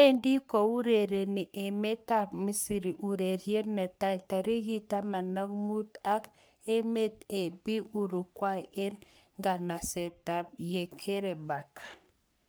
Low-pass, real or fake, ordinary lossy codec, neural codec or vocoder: none; fake; none; codec, 44.1 kHz, 3.4 kbps, Pupu-Codec